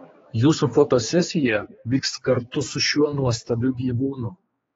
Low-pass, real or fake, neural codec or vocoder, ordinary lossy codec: 7.2 kHz; fake; codec, 16 kHz, 2 kbps, X-Codec, HuBERT features, trained on general audio; AAC, 24 kbps